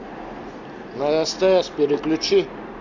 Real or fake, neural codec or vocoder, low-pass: real; none; 7.2 kHz